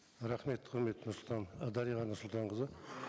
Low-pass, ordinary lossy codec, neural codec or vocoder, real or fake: none; none; none; real